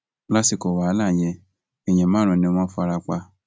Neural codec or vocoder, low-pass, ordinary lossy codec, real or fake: none; none; none; real